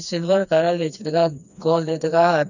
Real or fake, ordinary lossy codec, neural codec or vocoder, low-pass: fake; none; codec, 16 kHz, 2 kbps, FreqCodec, smaller model; 7.2 kHz